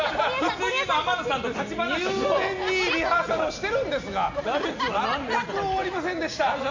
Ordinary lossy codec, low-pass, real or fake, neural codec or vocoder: MP3, 48 kbps; 7.2 kHz; real; none